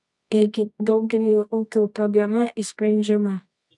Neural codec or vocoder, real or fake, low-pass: codec, 24 kHz, 0.9 kbps, WavTokenizer, medium music audio release; fake; 10.8 kHz